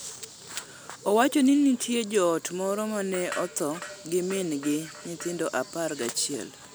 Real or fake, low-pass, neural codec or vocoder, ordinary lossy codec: real; none; none; none